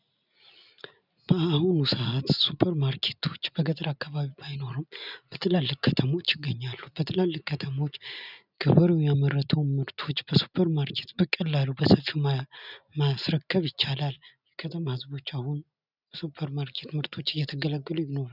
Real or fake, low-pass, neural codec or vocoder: real; 5.4 kHz; none